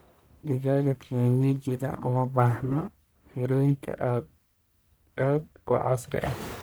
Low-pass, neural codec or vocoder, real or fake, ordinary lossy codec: none; codec, 44.1 kHz, 1.7 kbps, Pupu-Codec; fake; none